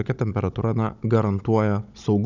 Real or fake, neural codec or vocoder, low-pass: fake; codec, 16 kHz, 16 kbps, FunCodec, trained on Chinese and English, 50 frames a second; 7.2 kHz